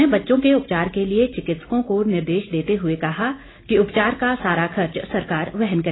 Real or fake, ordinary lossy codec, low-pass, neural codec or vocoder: real; AAC, 16 kbps; 7.2 kHz; none